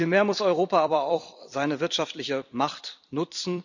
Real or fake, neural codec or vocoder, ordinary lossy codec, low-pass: fake; vocoder, 44.1 kHz, 128 mel bands every 256 samples, BigVGAN v2; none; 7.2 kHz